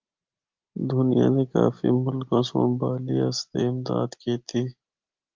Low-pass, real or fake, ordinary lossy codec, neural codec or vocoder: 7.2 kHz; real; Opus, 32 kbps; none